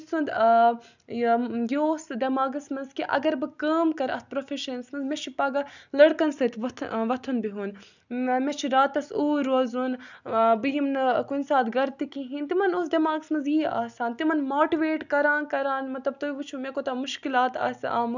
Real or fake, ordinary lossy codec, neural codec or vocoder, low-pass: real; none; none; 7.2 kHz